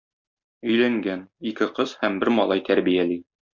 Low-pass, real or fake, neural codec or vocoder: 7.2 kHz; real; none